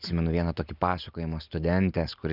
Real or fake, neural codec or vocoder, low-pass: real; none; 5.4 kHz